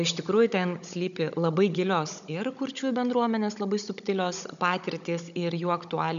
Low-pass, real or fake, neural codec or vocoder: 7.2 kHz; fake; codec, 16 kHz, 16 kbps, FunCodec, trained on Chinese and English, 50 frames a second